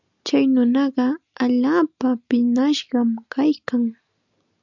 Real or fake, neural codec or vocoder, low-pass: real; none; 7.2 kHz